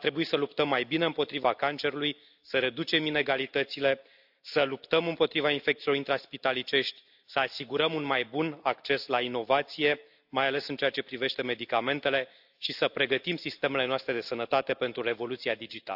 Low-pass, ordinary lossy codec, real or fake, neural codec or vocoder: 5.4 kHz; AAC, 48 kbps; real; none